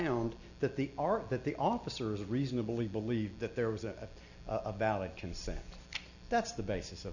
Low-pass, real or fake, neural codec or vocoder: 7.2 kHz; real; none